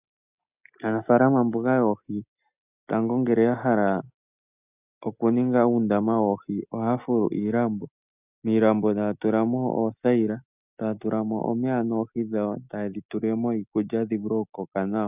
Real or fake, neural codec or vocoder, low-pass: real; none; 3.6 kHz